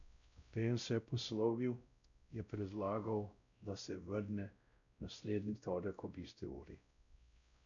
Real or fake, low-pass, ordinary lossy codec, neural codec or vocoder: fake; 7.2 kHz; none; codec, 16 kHz, 0.5 kbps, X-Codec, WavLM features, trained on Multilingual LibriSpeech